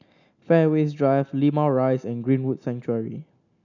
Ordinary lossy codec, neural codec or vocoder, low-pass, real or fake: none; none; 7.2 kHz; real